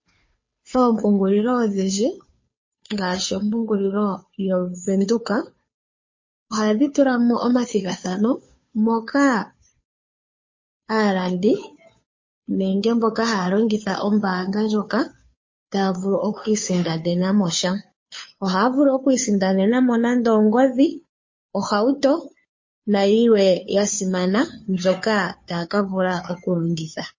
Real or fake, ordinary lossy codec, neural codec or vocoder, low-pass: fake; MP3, 32 kbps; codec, 16 kHz, 2 kbps, FunCodec, trained on Chinese and English, 25 frames a second; 7.2 kHz